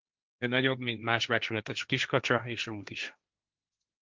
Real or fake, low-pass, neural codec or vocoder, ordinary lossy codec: fake; 7.2 kHz; codec, 16 kHz, 1.1 kbps, Voila-Tokenizer; Opus, 32 kbps